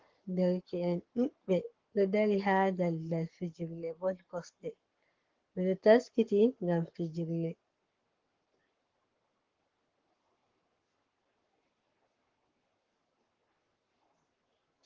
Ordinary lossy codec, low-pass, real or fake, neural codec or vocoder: Opus, 16 kbps; 7.2 kHz; fake; codec, 44.1 kHz, 7.8 kbps, Pupu-Codec